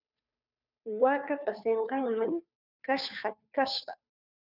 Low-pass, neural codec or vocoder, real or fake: 5.4 kHz; codec, 16 kHz, 2 kbps, FunCodec, trained on Chinese and English, 25 frames a second; fake